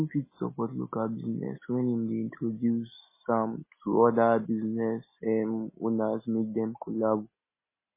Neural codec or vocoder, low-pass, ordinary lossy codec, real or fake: none; 3.6 kHz; MP3, 16 kbps; real